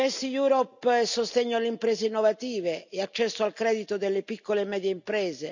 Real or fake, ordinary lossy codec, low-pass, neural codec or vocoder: real; none; 7.2 kHz; none